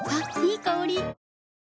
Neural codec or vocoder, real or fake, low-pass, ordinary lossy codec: none; real; none; none